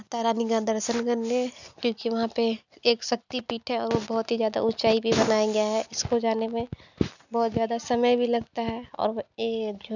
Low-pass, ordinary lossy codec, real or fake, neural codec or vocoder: 7.2 kHz; none; real; none